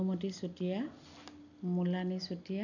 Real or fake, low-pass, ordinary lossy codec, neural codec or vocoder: real; 7.2 kHz; none; none